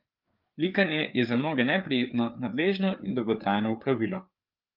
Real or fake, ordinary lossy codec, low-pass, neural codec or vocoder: fake; Opus, 24 kbps; 5.4 kHz; codec, 16 kHz, 4 kbps, FreqCodec, larger model